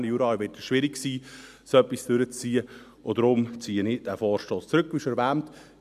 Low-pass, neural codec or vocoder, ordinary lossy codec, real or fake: 14.4 kHz; none; none; real